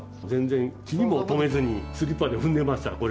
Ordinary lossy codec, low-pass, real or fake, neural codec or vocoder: none; none; real; none